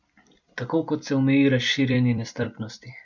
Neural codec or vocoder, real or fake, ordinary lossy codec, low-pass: vocoder, 44.1 kHz, 128 mel bands every 256 samples, BigVGAN v2; fake; none; 7.2 kHz